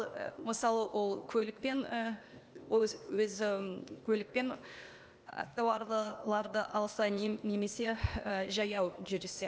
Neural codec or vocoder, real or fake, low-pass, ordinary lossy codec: codec, 16 kHz, 0.8 kbps, ZipCodec; fake; none; none